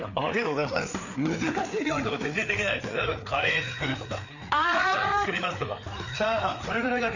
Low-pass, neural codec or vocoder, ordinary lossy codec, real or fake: 7.2 kHz; codec, 16 kHz, 4 kbps, FreqCodec, larger model; none; fake